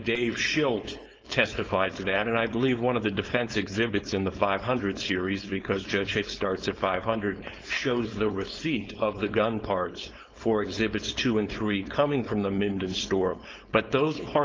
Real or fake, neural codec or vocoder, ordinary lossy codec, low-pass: fake; codec, 16 kHz, 4.8 kbps, FACodec; Opus, 24 kbps; 7.2 kHz